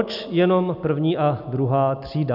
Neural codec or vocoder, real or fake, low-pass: none; real; 5.4 kHz